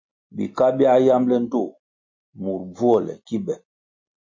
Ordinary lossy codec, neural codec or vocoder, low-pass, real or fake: MP3, 48 kbps; none; 7.2 kHz; real